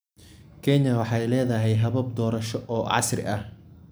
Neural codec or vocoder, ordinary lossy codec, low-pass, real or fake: none; none; none; real